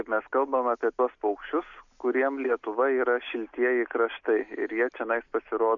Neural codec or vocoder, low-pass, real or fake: none; 7.2 kHz; real